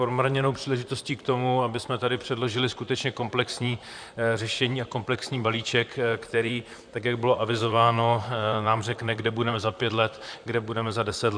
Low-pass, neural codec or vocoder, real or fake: 9.9 kHz; vocoder, 44.1 kHz, 128 mel bands, Pupu-Vocoder; fake